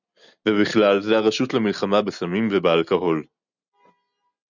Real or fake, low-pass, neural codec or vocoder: real; 7.2 kHz; none